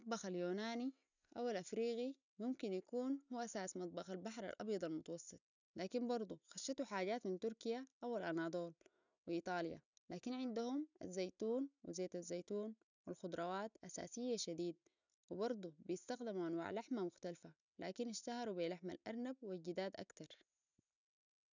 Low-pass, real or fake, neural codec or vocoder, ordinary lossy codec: 7.2 kHz; real; none; none